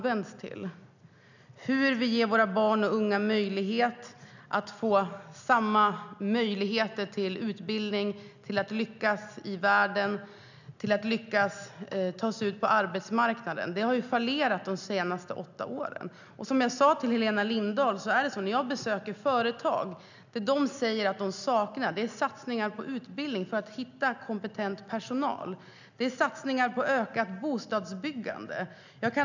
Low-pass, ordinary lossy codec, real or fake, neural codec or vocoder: 7.2 kHz; none; real; none